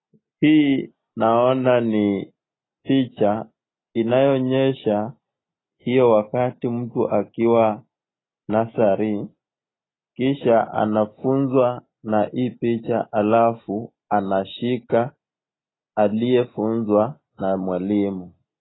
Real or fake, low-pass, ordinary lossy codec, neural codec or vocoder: real; 7.2 kHz; AAC, 16 kbps; none